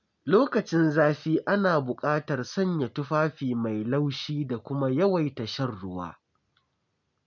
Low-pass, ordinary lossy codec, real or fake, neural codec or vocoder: 7.2 kHz; none; real; none